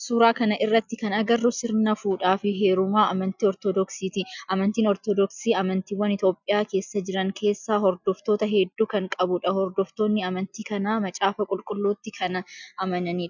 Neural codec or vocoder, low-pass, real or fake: none; 7.2 kHz; real